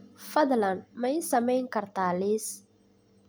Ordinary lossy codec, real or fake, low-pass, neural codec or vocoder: none; real; none; none